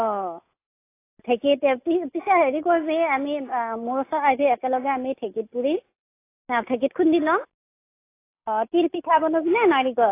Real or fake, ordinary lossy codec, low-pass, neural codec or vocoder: real; AAC, 24 kbps; 3.6 kHz; none